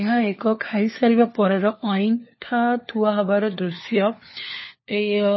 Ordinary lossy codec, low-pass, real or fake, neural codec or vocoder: MP3, 24 kbps; 7.2 kHz; fake; codec, 16 kHz, 4 kbps, FunCodec, trained on LibriTTS, 50 frames a second